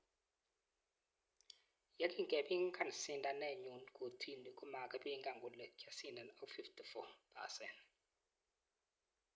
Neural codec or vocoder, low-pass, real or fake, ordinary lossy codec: none; none; real; none